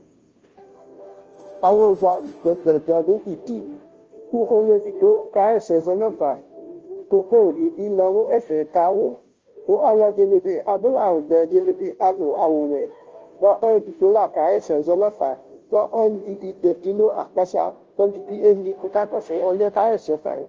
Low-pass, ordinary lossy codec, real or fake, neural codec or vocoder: 7.2 kHz; Opus, 24 kbps; fake; codec, 16 kHz, 0.5 kbps, FunCodec, trained on Chinese and English, 25 frames a second